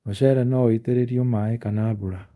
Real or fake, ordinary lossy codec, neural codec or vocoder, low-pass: fake; none; codec, 24 kHz, 0.5 kbps, DualCodec; none